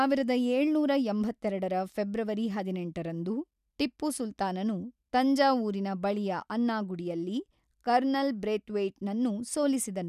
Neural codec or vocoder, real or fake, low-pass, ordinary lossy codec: none; real; 14.4 kHz; none